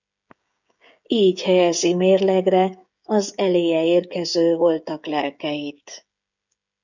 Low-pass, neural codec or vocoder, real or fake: 7.2 kHz; codec, 16 kHz, 16 kbps, FreqCodec, smaller model; fake